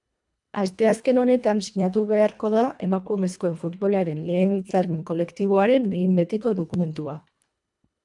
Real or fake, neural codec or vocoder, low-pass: fake; codec, 24 kHz, 1.5 kbps, HILCodec; 10.8 kHz